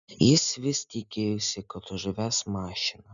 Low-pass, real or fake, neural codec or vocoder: 7.2 kHz; real; none